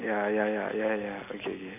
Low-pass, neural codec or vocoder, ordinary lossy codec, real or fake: 3.6 kHz; none; none; real